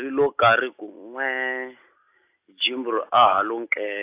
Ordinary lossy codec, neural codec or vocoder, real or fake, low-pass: AAC, 24 kbps; none; real; 3.6 kHz